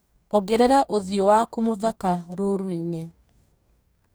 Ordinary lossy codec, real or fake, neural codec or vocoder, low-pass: none; fake; codec, 44.1 kHz, 2.6 kbps, DAC; none